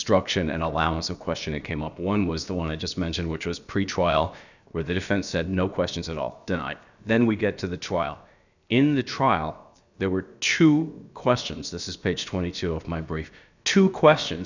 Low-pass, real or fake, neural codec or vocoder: 7.2 kHz; fake; codec, 16 kHz, about 1 kbps, DyCAST, with the encoder's durations